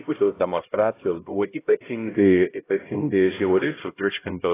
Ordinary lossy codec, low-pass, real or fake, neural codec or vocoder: AAC, 16 kbps; 3.6 kHz; fake; codec, 16 kHz, 0.5 kbps, X-Codec, HuBERT features, trained on LibriSpeech